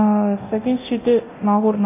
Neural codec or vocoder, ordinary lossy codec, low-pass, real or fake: codec, 24 kHz, 0.9 kbps, DualCodec; MP3, 24 kbps; 3.6 kHz; fake